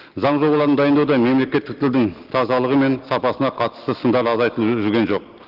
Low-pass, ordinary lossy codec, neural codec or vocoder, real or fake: 5.4 kHz; Opus, 16 kbps; none; real